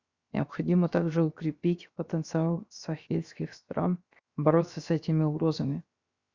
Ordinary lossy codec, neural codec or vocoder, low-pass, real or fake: Opus, 64 kbps; codec, 16 kHz, 0.7 kbps, FocalCodec; 7.2 kHz; fake